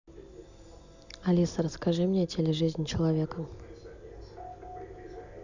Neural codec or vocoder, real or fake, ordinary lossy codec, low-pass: none; real; none; 7.2 kHz